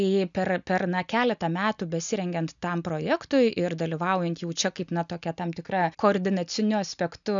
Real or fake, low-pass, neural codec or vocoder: real; 7.2 kHz; none